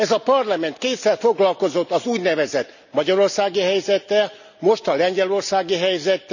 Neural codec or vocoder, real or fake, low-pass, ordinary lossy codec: none; real; 7.2 kHz; none